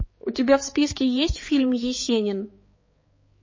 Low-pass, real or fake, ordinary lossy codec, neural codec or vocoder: 7.2 kHz; fake; MP3, 32 kbps; codec, 16 kHz, 4 kbps, X-Codec, HuBERT features, trained on balanced general audio